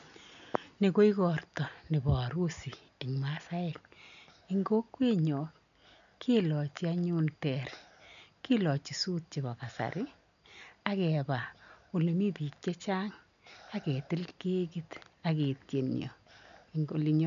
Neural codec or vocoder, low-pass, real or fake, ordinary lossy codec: none; 7.2 kHz; real; none